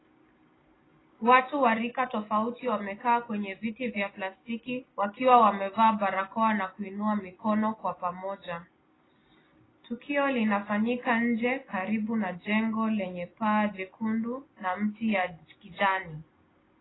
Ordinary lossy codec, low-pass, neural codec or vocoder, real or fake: AAC, 16 kbps; 7.2 kHz; none; real